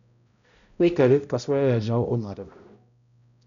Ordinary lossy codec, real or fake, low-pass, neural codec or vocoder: none; fake; 7.2 kHz; codec, 16 kHz, 0.5 kbps, X-Codec, HuBERT features, trained on balanced general audio